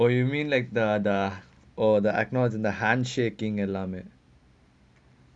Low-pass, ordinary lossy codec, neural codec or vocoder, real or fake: 9.9 kHz; none; none; real